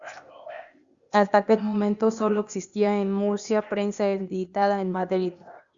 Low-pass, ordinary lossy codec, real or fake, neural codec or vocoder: 7.2 kHz; Opus, 64 kbps; fake; codec, 16 kHz, 0.8 kbps, ZipCodec